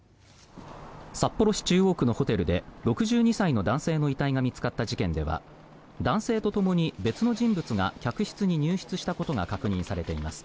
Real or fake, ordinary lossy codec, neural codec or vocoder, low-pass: real; none; none; none